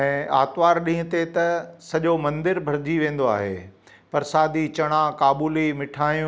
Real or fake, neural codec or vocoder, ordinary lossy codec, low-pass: real; none; none; none